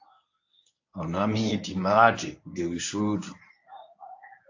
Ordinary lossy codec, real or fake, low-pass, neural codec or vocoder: AAC, 48 kbps; fake; 7.2 kHz; codec, 24 kHz, 0.9 kbps, WavTokenizer, medium speech release version 1